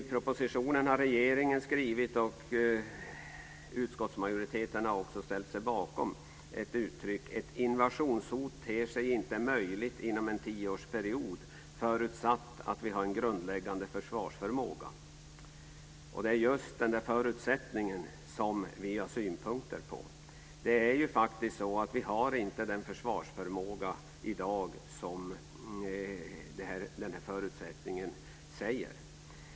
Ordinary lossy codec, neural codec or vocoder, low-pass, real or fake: none; none; none; real